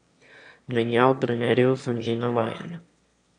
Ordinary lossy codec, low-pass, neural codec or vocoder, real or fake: none; 9.9 kHz; autoencoder, 22.05 kHz, a latent of 192 numbers a frame, VITS, trained on one speaker; fake